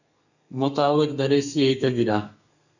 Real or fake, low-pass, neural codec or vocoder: fake; 7.2 kHz; codec, 32 kHz, 1.9 kbps, SNAC